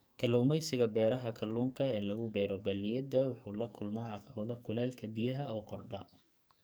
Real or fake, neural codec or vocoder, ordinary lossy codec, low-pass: fake; codec, 44.1 kHz, 2.6 kbps, SNAC; none; none